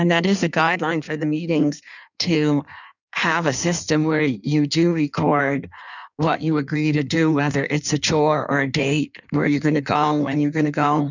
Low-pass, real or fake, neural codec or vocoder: 7.2 kHz; fake; codec, 16 kHz in and 24 kHz out, 1.1 kbps, FireRedTTS-2 codec